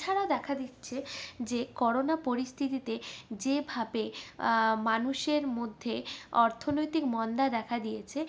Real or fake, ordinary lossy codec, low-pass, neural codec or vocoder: real; none; none; none